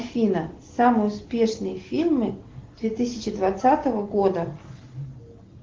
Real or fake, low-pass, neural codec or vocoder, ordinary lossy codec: real; 7.2 kHz; none; Opus, 16 kbps